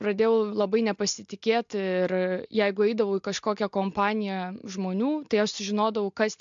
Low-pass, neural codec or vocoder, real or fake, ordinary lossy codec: 7.2 kHz; none; real; MP3, 64 kbps